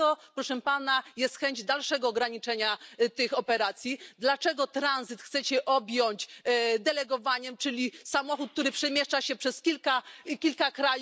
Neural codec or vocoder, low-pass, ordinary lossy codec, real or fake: none; none; none; real